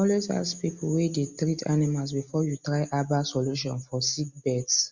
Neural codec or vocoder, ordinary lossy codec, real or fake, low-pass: none; Opus, 64 kbps; real; 7.2 kHz